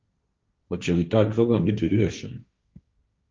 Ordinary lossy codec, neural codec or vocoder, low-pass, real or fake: Opus, 24 kbps; codec, 16 kHz, 1.1 kbps, Voila-Tokenizer; 7.2 kHz; fake